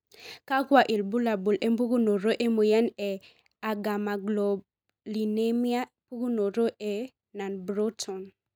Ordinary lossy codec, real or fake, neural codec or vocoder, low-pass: none; real; none; none